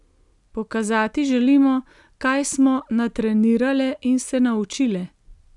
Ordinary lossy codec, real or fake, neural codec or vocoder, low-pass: none; real; none; 10.8 kHz